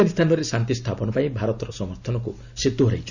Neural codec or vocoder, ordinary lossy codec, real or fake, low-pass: none; none; real; 7.2 kHz